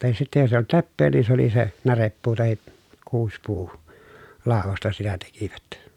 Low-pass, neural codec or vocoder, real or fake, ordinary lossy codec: 19.8 kHz; none; real; none